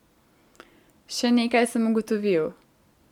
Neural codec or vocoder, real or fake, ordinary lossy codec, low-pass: none; real; MP3, 96 kbps; 19.8 kHz